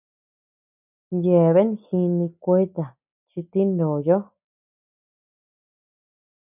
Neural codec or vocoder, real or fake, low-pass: none; real; 3.6 kHz